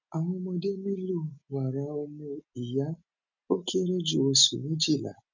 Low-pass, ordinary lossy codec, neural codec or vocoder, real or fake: 7.2 kHz; none; none; real